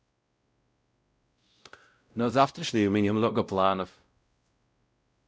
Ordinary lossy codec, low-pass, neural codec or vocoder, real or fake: none; none; codec, 16 kHz, 0.5 kbps, X-Codec, WavLM features, trained on Multilingual LibriSpeech; fake